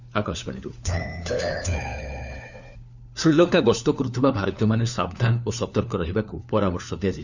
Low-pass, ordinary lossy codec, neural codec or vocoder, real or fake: 7.2 kHz; none; codec, 16 kHz, 4 kbps, FunCodec, trained on LibriTTS, 50 frames a second; fake